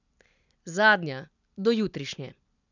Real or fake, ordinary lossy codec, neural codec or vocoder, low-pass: real; none; none; 7.2 kHz